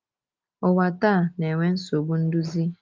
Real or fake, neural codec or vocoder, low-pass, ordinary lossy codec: real; none; 7.2 kHz; Opus, 32 kbps